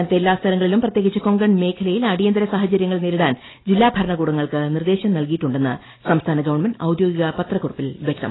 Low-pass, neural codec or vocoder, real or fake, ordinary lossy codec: 7.2 kHz; none; real; AAC, 16 kbps